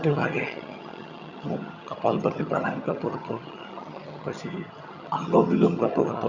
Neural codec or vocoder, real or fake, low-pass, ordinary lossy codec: vocoder, 22.05 kHz, 80 mel bands, HiFi-GAN; fake; 7.2 kHz; Opus, 64 kbps